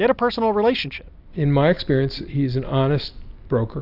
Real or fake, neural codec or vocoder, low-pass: real; none; 5.4 kHz